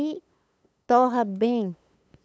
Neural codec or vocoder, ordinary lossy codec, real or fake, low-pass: codec, 16 kHz, 8 kbps, FunCodec, trained on LibriTTS, 25 frames a second; none; fake; none